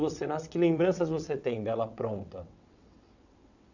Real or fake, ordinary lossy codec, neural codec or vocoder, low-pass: fake; none; vocoder, 22.05 kHz, 80 mel bands, WaveNeXt; 7.2 kHz